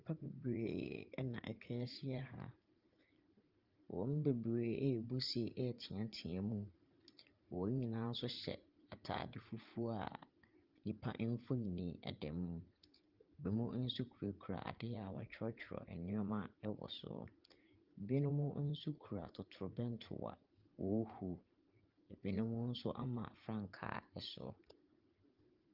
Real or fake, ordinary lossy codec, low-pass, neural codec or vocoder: fake; Opus, 32 kbps; 5.4 kHz; vocoder, 44.1 kHz, 80 mel bands, Vocos